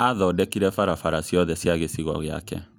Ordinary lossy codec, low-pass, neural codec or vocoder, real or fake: none; none; none; real